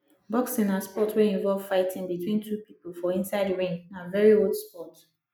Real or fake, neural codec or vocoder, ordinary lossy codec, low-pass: real; none; none; none